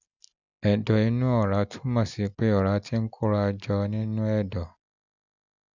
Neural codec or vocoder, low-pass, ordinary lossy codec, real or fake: none; 7.2 kHz; none; real